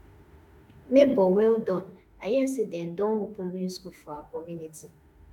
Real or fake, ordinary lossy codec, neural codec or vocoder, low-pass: fake; MP3, 96 kbps; autoencoder, 48 kHz, 32 numbers a frame, DAC-VAE, trained on Japanese speech; 19.8 kHz